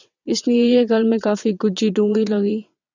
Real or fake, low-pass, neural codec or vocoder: fake; 7.2 kHz; vocoder, 22.05 kHz, 80 mel bands, WaveNeXt